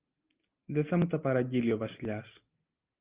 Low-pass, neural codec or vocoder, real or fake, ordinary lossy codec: 3.6 kHz; none; real; Opus, 24 kbps